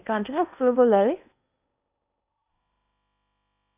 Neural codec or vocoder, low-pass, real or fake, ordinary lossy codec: codec, 16 kHz in and 24 kHz out, 0.8 kbps, FocalCodec, streaming, 65536 codes; 3.6 kHz; fake; none